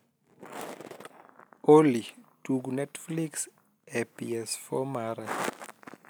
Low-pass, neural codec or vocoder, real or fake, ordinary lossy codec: none; none; real; none